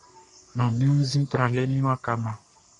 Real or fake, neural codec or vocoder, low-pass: fake; codec, 44.1 kHz, 3.4 kbps, Pupu-Codec; 10.8 kHz